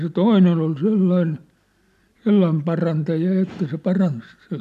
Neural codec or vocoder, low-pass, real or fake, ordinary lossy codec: none; 14.4 kHz; real; none